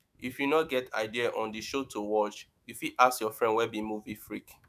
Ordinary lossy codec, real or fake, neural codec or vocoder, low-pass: none; fake; autoencoder, 48 kHz, 128 numbers a frame, DAC-VAE, trained on Japanese speech; 14.4 kHz